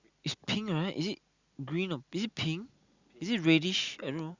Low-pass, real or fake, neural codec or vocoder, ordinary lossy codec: 7.2 kHz; real; none; Opus, 64 kbps